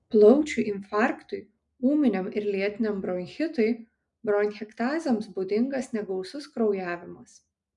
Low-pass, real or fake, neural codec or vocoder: 10.8 kHz; real; none